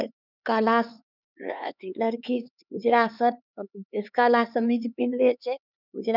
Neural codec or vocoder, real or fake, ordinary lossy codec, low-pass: codec, 16 kHz, 4 kbps, X-Codec, HuBERT features, trained on LibriSpeech; fake; none; 5.4 kHz